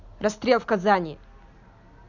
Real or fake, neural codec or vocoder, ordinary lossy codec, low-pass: real; none; none; 7.2 kHz